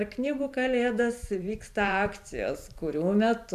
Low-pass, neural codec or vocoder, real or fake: 14.4 kHz; vocoder, 44.1 kHz, 128 mel bands every 512 samples, BigVGAN v2; fake